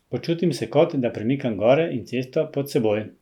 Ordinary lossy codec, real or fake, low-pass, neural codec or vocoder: none; real; 19.8 kHz; none